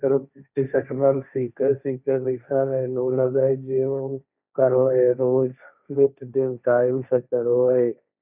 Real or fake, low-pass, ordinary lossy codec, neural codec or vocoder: fake; 3.6 kHz; none; codec, 16 kHz, 1.1 kbps, Voila-Tokenizer